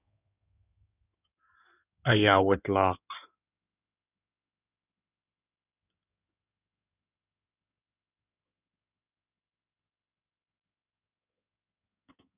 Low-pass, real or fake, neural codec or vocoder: 3.6 kHz; fake; codec, 16 kHz in and 24 kHz out, 2.2 kbps, FireRedTTS-2 codec